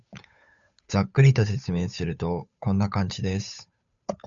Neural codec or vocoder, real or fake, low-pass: codec, 16 kHz, 8 kbps, FunCodec, trained on Chinese and English, 25 frames a second; fake; 7.2 kHz